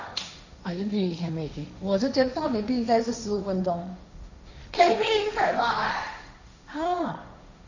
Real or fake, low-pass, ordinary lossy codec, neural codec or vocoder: fake; 7.2 kHz; none; codec, 16 kHz, 1.1 kbps, Voila-Tokenizer